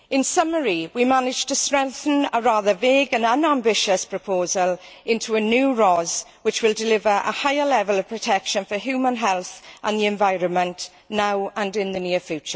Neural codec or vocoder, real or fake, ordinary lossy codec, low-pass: none; real; none; none